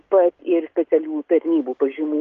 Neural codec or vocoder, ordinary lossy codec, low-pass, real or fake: none; Opus, 32 kbps; 7.2 kHz; real